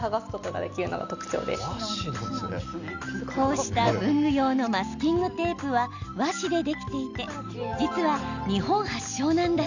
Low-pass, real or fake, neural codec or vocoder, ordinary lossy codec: 7.2 kHz; real; none; none